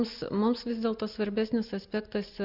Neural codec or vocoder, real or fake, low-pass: none; real; 5.4 kHz